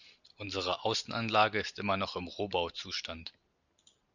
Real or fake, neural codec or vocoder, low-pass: real; none; 7.2 kHz